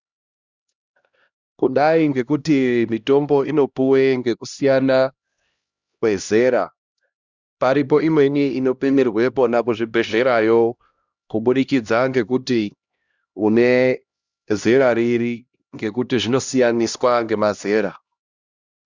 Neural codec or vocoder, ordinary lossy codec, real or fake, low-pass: codec, 16 kHz, 1 kbps, X-Codec, HuBERT features, trained on LibriSpeech; Opus, 64 kbps; fake; 7.2 kHz